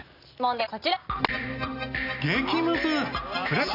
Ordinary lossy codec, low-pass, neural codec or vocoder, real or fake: none; 5.4 kHz; none; real